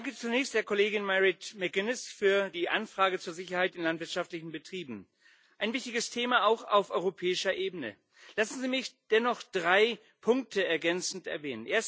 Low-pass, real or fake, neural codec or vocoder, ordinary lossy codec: none; real; none; none